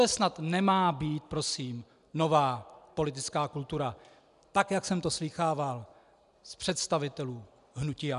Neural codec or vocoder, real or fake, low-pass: none; real; 10.8 kHz